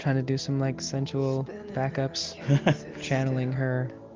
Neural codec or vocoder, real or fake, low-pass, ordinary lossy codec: none; real; 7.2 kHz; Opus, 24 kbps